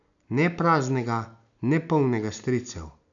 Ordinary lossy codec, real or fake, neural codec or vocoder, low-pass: none; real; none; 7.2 kHz